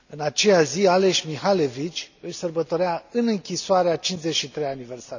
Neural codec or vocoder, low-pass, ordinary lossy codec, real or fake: none; 7.2 kHz; none; real